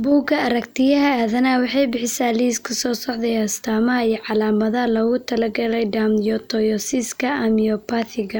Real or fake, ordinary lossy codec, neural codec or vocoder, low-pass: real; none; none; none